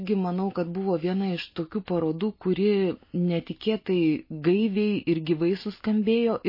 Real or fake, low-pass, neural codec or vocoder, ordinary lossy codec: real; 5.4 kHz; none; MP3, 24 kbps